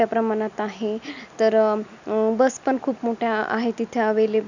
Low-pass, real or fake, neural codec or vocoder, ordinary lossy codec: 7.2 kHz; real; none; none